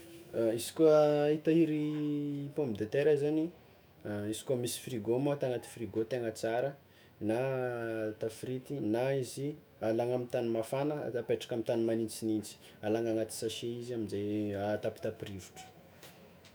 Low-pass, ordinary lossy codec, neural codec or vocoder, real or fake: none; none; autoencoder, 48 kHz, 128 numbers a frame, DAC-VAE, trained on Japanese speech; fake